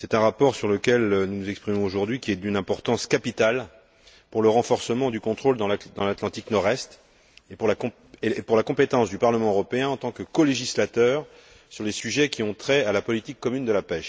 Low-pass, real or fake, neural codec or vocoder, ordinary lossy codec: none; real; none; none